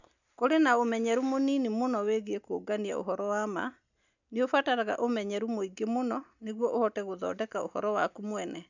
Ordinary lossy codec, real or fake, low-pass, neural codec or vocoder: none; real; 7.2 kHz; none